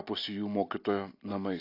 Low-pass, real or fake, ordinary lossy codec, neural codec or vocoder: 5.4 kHz; real; AAC, 32 kbps; none